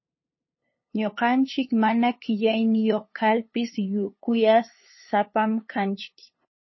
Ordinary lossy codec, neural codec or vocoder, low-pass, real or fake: MP3, 24 kbps; codec, 16 kHz, 2 kbps, FunCodec, trained on LibriTTS, 25 frames a second; 7.2 kHz; fake